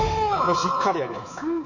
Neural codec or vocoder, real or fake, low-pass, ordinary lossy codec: codec, 24 kHz, 3.1 kbps, DualCodec; fake; 7.2 kHz; none